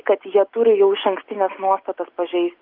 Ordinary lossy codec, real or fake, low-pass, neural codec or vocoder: Opus, 32 kbps; real; 5.4 kHz; none